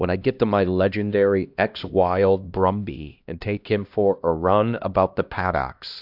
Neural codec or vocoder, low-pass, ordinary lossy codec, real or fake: codec, 16 kHz, 1 kbps, X-Codec, HuBERT features, trained on LibriSpeech; 5.4 kHz; AAC, 48 kbps; fake